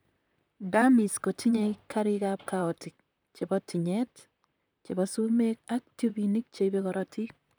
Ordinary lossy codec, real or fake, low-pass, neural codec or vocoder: none; fake; none; vocoder, 44.1 kHz, 128 mel bands, Pupu-Vocoder